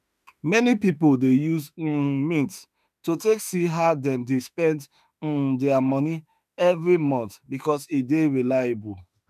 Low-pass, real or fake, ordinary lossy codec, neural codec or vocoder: 14.4 kHz; fake; none; autoencoder, 48 kHz, 32 numbers a frame, DAC-VAE, trained on Japanese speech